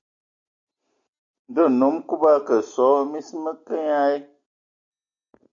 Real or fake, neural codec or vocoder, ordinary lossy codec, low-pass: real; none; AAC, 64 kbps; 7.2 kHz